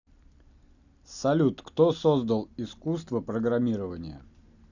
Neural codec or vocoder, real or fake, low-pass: none; real; 7.2 kHz